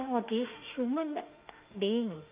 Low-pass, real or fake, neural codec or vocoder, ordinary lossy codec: 3.6 kHz; fake; autoencoder, 48 kHz, 32 numbers a frame, DAC-VAE, trained on Japanese speech; Opus, 24 kbps